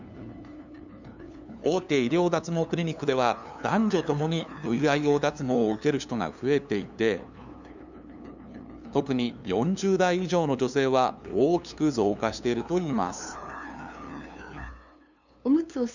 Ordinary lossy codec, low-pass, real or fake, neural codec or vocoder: none; 7.2 kHz; fake; codec, 16 kHz, 2 kbps, FunCodec, trained on LibriTTS, 25 frames a second